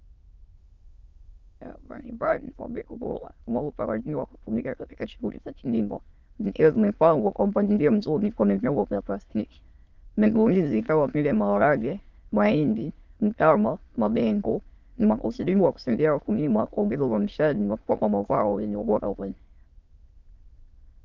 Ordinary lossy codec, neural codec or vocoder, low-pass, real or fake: Opus, 32 kbps; autoencoder, 22.05 kHz, a latent of 192 numbers a frame, VITS, trained on many speakers; 7.2 kHz; fake